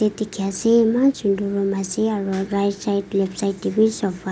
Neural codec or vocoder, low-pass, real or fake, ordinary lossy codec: none; none; real; none